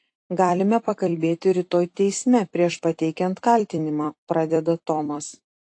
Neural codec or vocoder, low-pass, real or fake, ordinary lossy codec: vocoder, 44.1 kHz, 128 mel bands every 256 samples, BigVGAN v2; 9.9 kHz; fake; AAC, 48 kbps